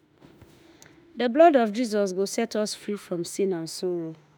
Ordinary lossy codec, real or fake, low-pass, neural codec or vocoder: none; fake; none; autoencoder, 48 kHz, 32 numbers a frame, DAC-VAE, trained on Japanese speech